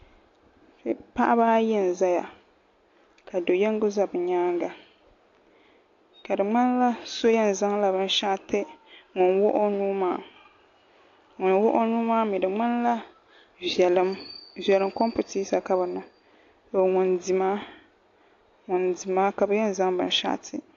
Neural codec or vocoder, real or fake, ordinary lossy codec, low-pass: none; real; AAC, 48 kbps; 7.2 kHz